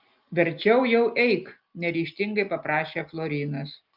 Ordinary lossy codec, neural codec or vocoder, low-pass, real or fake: Opus, 24 kbps; none; 5.4 kHz; real